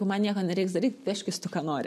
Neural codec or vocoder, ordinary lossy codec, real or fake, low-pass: none; MP3, 64 kbps; real; 14.4 kHz